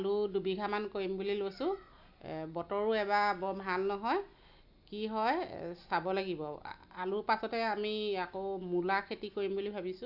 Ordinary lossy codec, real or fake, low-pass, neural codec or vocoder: none; real; 5.4 kHz; none